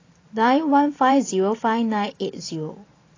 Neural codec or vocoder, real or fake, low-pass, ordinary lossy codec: vocoder, 44.1 kHz, 128 mel bands every 512 samples, BigVGAN v2; fake; 7.2 kHz; AAC, 32 kbps